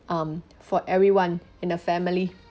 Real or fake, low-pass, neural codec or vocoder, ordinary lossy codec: real; none; none; none